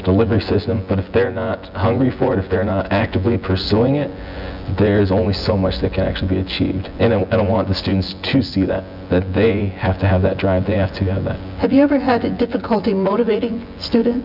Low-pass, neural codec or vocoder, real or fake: 5.4 kHz; vocoder, 24 kHz, 100 mel bands, Vocos; fake